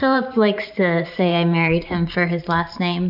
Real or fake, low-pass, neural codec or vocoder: fake; 5.4 kHz; vocoder, 44.1 kHz, 128 mel bands every 512 samples, BigVGAN v2